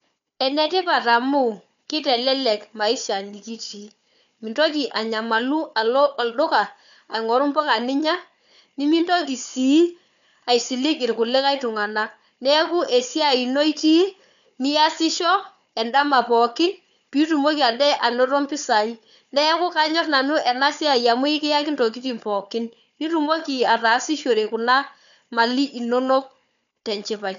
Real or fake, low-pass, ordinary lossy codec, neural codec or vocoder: fake; 7.2 kHz; none; codec, 16 kHz, 4 kbps, FunCodec, trained on Chinese and English, 50 frames a second